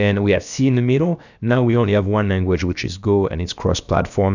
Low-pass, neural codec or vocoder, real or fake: 7.2 kHz; codec, 16 kHz, about 1 kbps, DyCAST, with the encoder's durations; fake